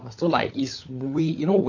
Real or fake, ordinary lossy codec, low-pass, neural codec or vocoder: fake; AAC, 48 kbps; 7.2 kHz; codec, 16 kHz, 16 kbps, FunCodec, trained on LibriTTS, 50 frames a second